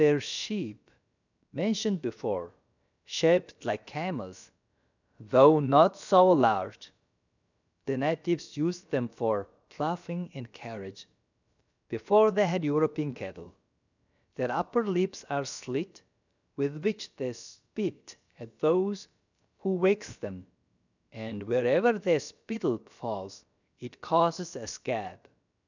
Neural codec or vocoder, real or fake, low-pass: codec, 16 kHz, about 1 kbps, DyCAST, with the encoder's durations; fake; 7.2 kHz